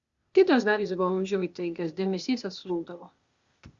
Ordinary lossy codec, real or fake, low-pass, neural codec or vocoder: Opus, 64 kbps; fake; 7.2 kHz; codec, 16 kHz, 0.8 kbps, ZipCodec